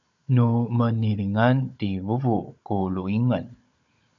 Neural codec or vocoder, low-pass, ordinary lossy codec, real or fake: codec, 16 kHz, 16 kbps, FunCodec, trained on Chinese and English, 50 frames a second; 7.2 kHz; AAC, 64 kbps; fake